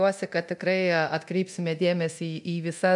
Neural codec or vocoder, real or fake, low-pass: codec, 24 kHz, 0.9 kbps, DualCodec; fake; 10.8 kHz